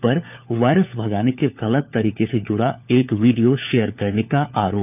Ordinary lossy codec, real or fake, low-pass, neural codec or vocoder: none; fake; 3.6 kHz; codec, 16 kHz, 4 kbps, FunCodec, trained on LibriTTS, 50 frames a second